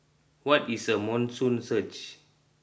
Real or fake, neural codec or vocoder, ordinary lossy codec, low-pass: real; none; none; none